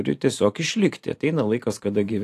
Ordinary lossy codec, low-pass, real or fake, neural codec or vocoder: AAC, 64 kbps; 14.4 kHz; fake; vocoder, 44.1 kHz, 128 mel bands every 512 samples, BigVGAN v2